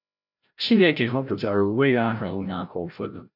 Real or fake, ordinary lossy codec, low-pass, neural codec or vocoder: fake; none; 5.4 kHz; codec, 16 kHz, 0.5 kbps, FreqCodec, larger model